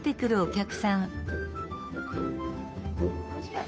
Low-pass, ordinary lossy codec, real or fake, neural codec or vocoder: none; none; fake; codec, 16 kHz, 2 kbps, FunCodec, trained on Chinese and English, 25 frames a second